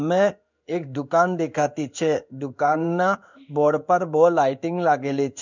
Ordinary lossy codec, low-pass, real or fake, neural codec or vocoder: none; 7.2 kHz; fake; codec, 16 kHz in and 24 kHz out, 1 kbps, XY-Tokenizer